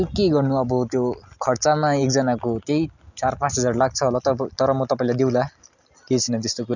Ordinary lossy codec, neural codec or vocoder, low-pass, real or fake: none; none; 7.2 kHz; real